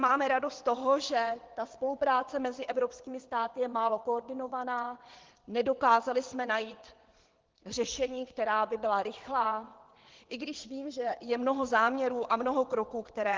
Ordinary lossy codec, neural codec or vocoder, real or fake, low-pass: Opus, 16 kbps; vocoder, 22.05 kHz, 80 mel bands, WaveNeXt; fake; 7.2 kHz